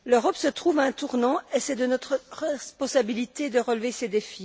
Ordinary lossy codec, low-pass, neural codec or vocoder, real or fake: none; none; none; real